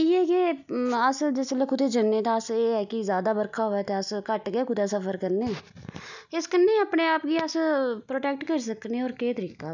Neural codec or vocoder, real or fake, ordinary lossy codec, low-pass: none; real; none; 7.2 kHz